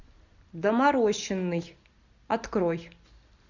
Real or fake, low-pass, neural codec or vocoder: real; 7.2 kHz; none